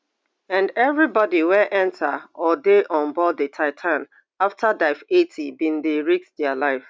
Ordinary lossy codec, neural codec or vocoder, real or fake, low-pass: none; none; real; none